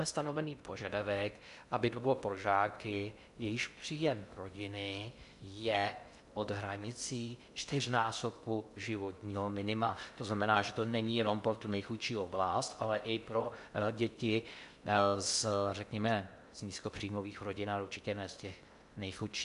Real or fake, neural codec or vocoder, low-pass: fake; codec, 16 kHz in and 24 kHz out, 0.6 kbps, FocalCodec, streaming, 2048 codes; 10.8 kHz